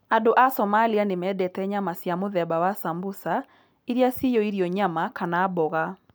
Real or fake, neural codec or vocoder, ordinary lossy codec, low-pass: real; none; none; none